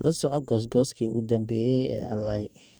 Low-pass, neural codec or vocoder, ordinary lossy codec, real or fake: none; codec, 44.1 kHz, 2.6 kbps, DAC; none; fake